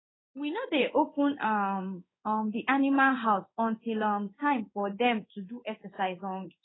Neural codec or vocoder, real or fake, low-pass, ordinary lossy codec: codec, 16 kHz in and 24 kHz out, 1 kbps, XY-Tokenizer; fake; 7.2 kHz; AAC, 16 kbps